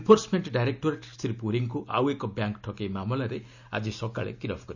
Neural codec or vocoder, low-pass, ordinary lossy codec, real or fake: none; 7.2 kHz; none; real